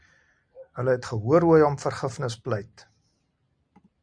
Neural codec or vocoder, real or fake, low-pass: none; real; 9.9 kHz